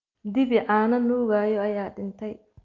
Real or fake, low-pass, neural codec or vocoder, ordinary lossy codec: real; 7.2 kHz; none; Opus, 24 kbps